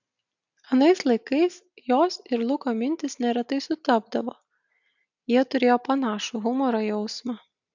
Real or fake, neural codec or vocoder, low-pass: real; none; 7.2 kHz